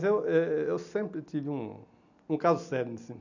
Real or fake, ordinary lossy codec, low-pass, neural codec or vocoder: real; none; 7.2 kHz; none